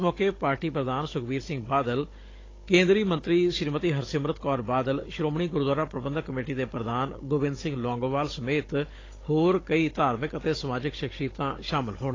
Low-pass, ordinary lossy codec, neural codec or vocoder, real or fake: 7.2 kHz; AAC, 32 kbps; codec, 16 kHz, 16 kbps, FunCodec, trained on Chinese and English, 50 frames a second; fake